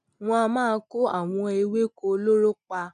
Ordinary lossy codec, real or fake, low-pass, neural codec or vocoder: none; real; 9.9 kHz; none